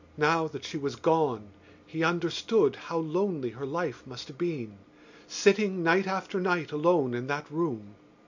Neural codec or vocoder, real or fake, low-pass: none; real; 7.2 kHz